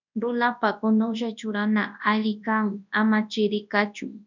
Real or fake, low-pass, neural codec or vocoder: fake; 7.2 kHz; codec, 24 kHz, 0.9 kbps, WavTokenizer, large speech release